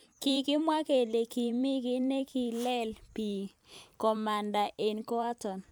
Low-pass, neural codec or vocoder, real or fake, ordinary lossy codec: none; vocoder, 44.1 kHz, 128 mel bands every 512 samples, BigVGAN v2; fake; none